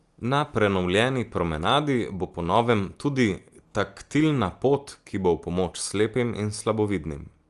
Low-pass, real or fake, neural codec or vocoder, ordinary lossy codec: 10.8 kHz; real; none; Opus, 32 kbps